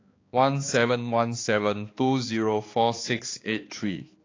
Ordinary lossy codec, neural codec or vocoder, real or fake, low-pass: AAC, 32 kbps; codec, 16 kHz, 2 kbps, X-Codec, HuBERT features, trained on balanced general audio; fake; 7.2 kHz